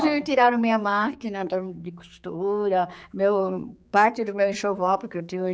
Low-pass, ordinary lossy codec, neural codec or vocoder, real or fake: none; none; codec, 16 kHz, 4 kbps, X-Codec, HuBERT features, trained on general audio; fake